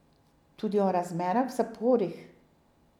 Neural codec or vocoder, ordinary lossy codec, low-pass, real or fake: none; none; 19.8 kHz; real